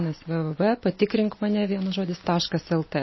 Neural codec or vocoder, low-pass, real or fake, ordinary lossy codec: none; 7.2 kHz; real; MP3, 24 kbps